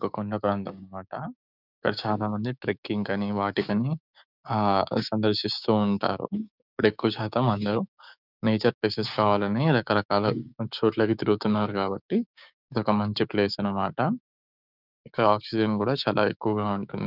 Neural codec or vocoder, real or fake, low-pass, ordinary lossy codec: codec, 16 kHz, 6 kbps, DAC; fake; 5.4 kHz; none